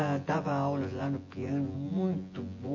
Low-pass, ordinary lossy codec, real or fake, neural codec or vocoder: 7.2 kHz; MP3, 32 kbps; fake; vocoder, 24 kHz, 100 mel bands, Vocos